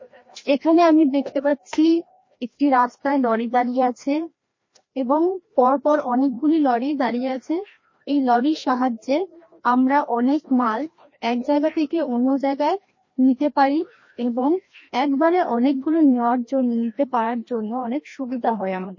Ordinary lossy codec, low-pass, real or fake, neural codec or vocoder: MP3, 32 kbps; 7.2 kHz; fake; codec, 16 kHz, 1 kbps, FreqCodec, larger model